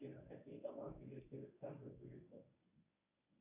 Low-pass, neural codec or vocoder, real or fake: 3.6 kHz; codec, 24 kHz, 0.9 kbps, WavTokenizer, medium speech release version 1; fake